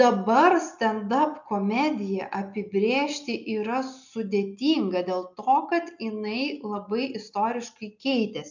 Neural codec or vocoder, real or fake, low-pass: none; real; 7.2 kHz